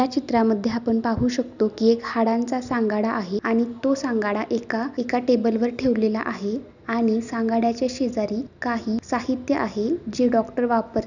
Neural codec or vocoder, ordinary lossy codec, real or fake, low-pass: none; none; real; 7.2 kHz